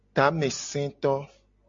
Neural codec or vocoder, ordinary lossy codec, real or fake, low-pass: none; MP3, 48 kbps; real; 7.2 kHz